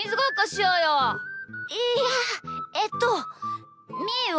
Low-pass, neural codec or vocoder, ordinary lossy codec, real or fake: none; none; none; real